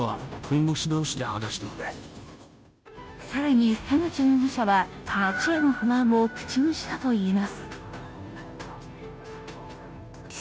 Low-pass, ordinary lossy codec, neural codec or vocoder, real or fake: none; none; codec, 16 kHz, 0.5 kbps, FunCodec, trained on Chinese and English, 25 frames a second; fake